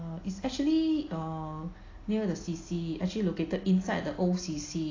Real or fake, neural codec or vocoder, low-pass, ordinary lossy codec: real; none; 7.2 kHz; AAC, 32 kbps